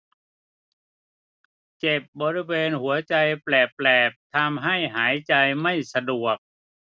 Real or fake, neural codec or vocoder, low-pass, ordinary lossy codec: real; none; none; none